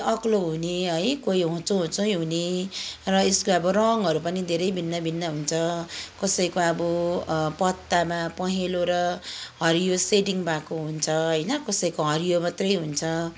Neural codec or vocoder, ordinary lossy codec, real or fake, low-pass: none; none; real; none